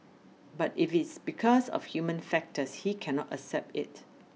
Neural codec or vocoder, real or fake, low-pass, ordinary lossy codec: none; real; none; none